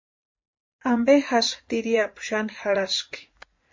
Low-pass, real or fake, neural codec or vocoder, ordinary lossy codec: 7.2 kHz; fake; vocoder, 44.1 kHz, 80 mel bands, Vocos; MP3, 32 kbps